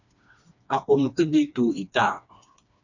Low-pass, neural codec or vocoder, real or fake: 7.2 kHz; codec, 16 kHz, 2 kbps, FreqCodec, smaller model; fake